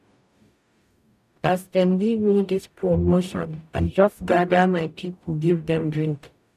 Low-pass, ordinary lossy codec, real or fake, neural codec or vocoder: 14.4 kHz; none; fake; codec, 44.1 kHz, 0.9 kbps, DAC